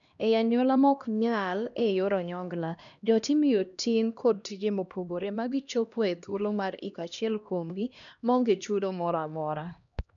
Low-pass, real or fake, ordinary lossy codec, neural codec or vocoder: 7.2 kHz; fake; none; codec, 16 kHz, 1 kbps, X-Codec, HuBERT features, trained on LibriSpeech